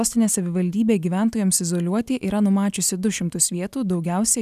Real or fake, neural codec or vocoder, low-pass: real; none; 14.4 kHz